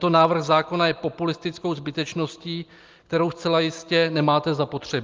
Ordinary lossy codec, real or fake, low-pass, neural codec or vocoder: Opus, 32 kbps; real; 7.2 kHz; none